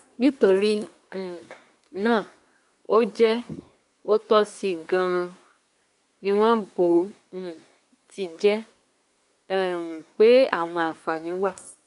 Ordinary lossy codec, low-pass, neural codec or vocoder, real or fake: none; 10.8 kHz; codec, 24 kHz, 1 kbps, SNAC; fake